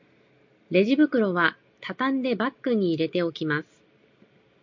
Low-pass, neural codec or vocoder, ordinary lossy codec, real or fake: 7.2 kHz; none; MP3, 48 kbps; real